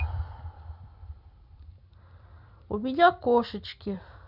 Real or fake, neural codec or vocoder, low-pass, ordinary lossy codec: real; none; 5.4 kHz; none